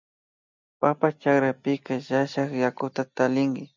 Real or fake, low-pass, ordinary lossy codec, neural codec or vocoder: real; 7.2 kHz; MP3, 64 kbps; none